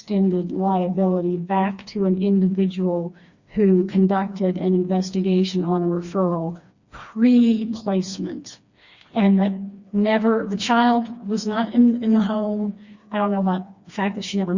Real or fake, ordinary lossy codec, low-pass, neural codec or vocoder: fake; Opus, 64 kbps; 7.2 kHz; codec, 16 kHz, 2 kbps, FreqCodec, smaller model